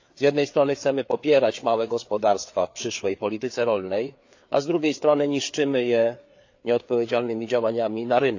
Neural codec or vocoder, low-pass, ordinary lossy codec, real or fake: codec, 16 kHz, 4 kbps, FreqCodec, larger model; 7.2 kHz; AAC, 48 kbps; fake